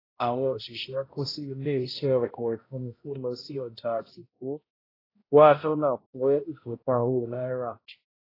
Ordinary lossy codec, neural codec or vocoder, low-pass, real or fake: AAC, 24 kbps; codec, 16 kHz, 0.5 kbps, X-Codec, HuBERT features, trained on balanced general audio; 5.4 kHz; fake